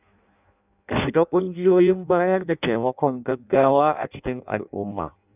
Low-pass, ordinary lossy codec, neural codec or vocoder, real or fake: 3.6 kHz; none; codec, 16 kHz in and 24 kHz out, 0.6 kbps, FireRedTTS-2 codec; fake